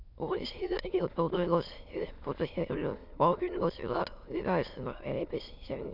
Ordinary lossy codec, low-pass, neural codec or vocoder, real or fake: none; 5.4 kHz; autoencoder, 22.05 kHz, a latent of 192 numbers a frame, VITS, trained on many speakers; fake